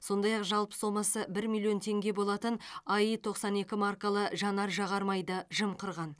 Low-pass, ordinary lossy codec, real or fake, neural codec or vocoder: none; none; real; none